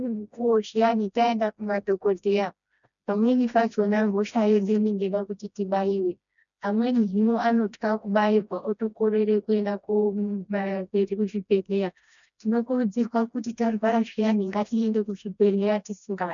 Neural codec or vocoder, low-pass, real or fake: codec, 16 kHz, 1 kbps, FreqCodec, smaller model; 7.2 kHz; fake